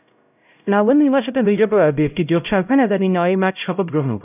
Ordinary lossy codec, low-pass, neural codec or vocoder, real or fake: none; 3.6 kHz; codec, 16 kHz, 0.5 kbps, X-Codec, HuBERT features, trained on LibriSpeech; fake